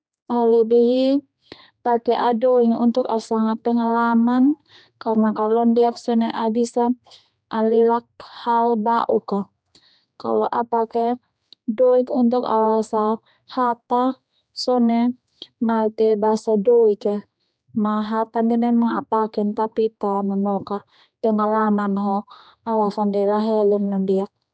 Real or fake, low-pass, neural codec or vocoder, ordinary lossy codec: fake; none; codec, 16 kHz, 2 kbps, X-Codec, HuBERT features, trained on general audio; none